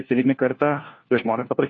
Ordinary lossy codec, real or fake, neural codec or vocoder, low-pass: AAC, 32 kbps; fake; codec, 16 kHz, 1 kbps, FunCodec, trained on LibriTTS, 50 frames a second; 7.2 kHz